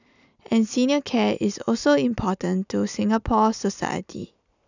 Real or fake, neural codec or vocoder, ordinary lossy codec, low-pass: real; none; none; 7.2 kHz